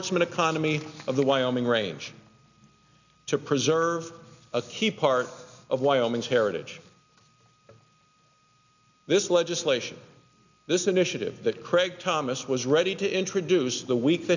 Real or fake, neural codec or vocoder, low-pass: real; none; 7.2 kHz